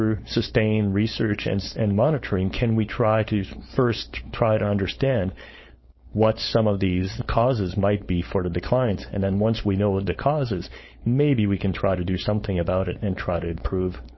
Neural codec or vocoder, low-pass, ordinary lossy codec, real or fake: codec, 16 kHz, 4.8 kbps, FACodec; 7.2 kHz; MP3, 24 kbps; fake